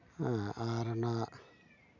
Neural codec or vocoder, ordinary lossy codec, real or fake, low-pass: none; Opus, 64 kbps; real; 7.2 kHz